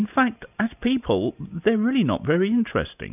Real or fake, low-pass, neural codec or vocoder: real; 3.6 kHz; none